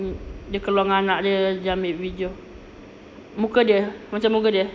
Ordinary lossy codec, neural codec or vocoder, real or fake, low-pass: none; none; real; none